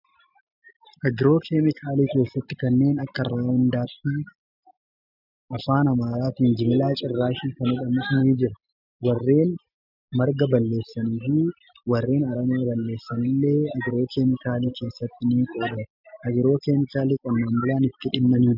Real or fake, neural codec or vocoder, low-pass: real; none; 5.4 kHz